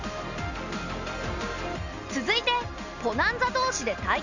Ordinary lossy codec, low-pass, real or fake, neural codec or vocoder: none; 7.2 kHz; real; none